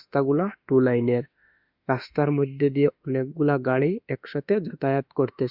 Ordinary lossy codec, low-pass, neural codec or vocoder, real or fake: Opus, 64 kbps; 5.4 kHz; autoencoder, 48 kHz, 32 numbers a frame, DAC-VAE, trained on Japanese speech; fake